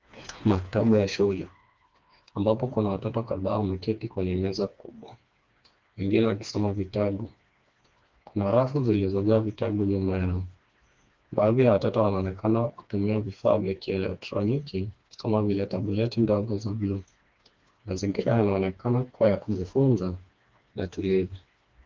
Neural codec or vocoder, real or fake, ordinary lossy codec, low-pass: codec, 16 kHz, 2 kbps, FreqCodec, smaller model; fake; Opus, 32 kbps; 7.2 kHz